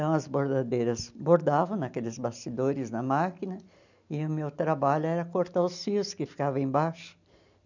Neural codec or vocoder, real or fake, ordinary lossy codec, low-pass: none; real; none; 7.2 kHz